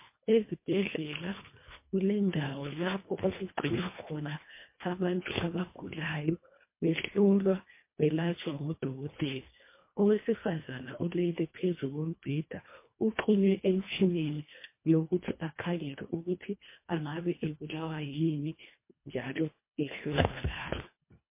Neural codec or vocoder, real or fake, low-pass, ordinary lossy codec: codec, 24 kHz, 1.5 kbps, HILCodec; fake; 3.6 kHz; MP3, 24 kbps